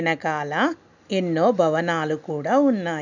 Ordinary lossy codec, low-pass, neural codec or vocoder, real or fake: none; 7.2 kHz; none; real